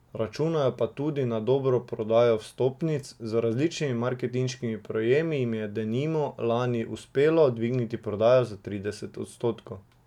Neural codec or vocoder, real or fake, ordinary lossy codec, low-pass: none; real; none; 19.8 kHz